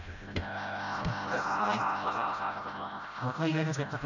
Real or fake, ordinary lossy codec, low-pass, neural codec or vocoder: fake; none; 7.2 kHz; codec, 16 kHz, 0.5 kbps, FreqCodec, smaller model